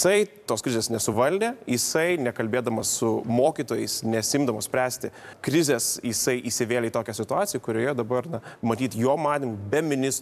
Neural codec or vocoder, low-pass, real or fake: vocoder, 44.1 kHz, 128 mel bands every 256 samples, BigVGAN v2; 14.4 kHz; fake